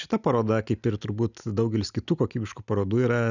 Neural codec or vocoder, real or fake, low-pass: none; real; 7.2 kHz